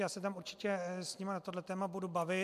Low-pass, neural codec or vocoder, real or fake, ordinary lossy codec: 14.4 kHz; none; real; AAC, 96 kbps